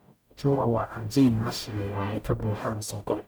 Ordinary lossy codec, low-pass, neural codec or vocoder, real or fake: none; none; codec, 44.1 kHz, 0.9 kbps, DAC; fake